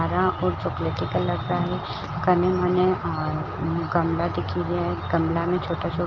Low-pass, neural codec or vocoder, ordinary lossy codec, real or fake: 7.2 kHz; none; Opus, 32 kbps; real